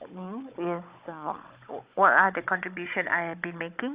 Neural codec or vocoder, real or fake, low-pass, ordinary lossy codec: codec, 16 kHz, 16 kbps, FunCodec, trained on Chinese and English, 50 frames a second; fake; 3.6 kHz; Opus, 64 kbps